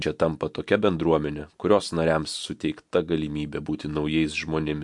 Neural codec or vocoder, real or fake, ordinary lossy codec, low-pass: none; real; MP3, 64 kbps; 10.8 kHz